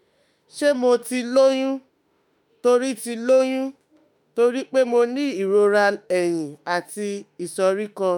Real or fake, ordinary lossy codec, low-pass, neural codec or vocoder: fake; none; 19.8 kHz; autoencoder, 48 kHz, 32 numbers a frame, DAC-VAE, trained on Japanese speech